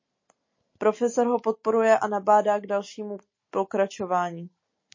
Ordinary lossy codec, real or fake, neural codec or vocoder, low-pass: MP3, 32 kbps; real; none; 7.2 kHz